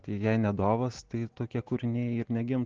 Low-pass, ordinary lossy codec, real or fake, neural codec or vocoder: 7.2 kHz; Opus, 16 kbps; real; none